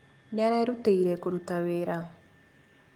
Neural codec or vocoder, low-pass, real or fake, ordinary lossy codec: codec, 44.1 kHz, 7.8 kbps, Pupu-Codec; 19.8 kHz; fake; Opus, 32 kbps